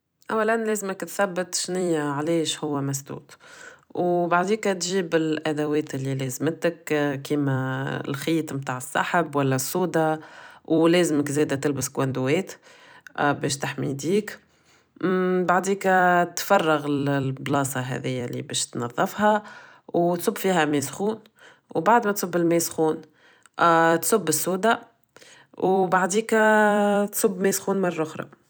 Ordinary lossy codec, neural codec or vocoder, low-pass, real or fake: none; vocoder, 44.1 kHz, 128 mel bands every 256 samples, BigVGAN v2; none; fake